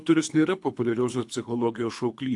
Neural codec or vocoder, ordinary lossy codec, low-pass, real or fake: codec, 24 kHz, 3 kbps, HILCodec; AAC, 64 kbps; 10.8 kHz; fake